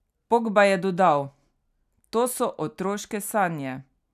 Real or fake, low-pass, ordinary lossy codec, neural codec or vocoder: real; 14.4 kHz; none; none